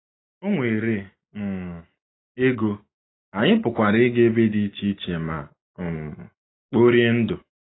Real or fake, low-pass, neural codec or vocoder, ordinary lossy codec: real; 7.2 kHz; none; AAC, 16 kbps